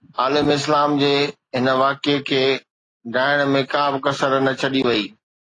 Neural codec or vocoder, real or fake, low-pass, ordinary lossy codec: none; real; 10.8 kHz; AAC, 32 kbps